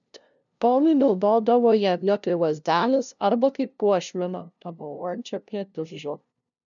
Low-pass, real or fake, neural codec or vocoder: 7.2 kHz; fake; codec, 16 kHz, 0.5 kbps, FunCodec, trained on LibriTTS, 25 frames a second